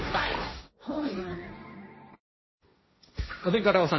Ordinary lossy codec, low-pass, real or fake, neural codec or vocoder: MP3, 24 kbps; 7.2 kHz; fake; codec, 16 kHz, 1.1 kbps, Voila-Tokenizer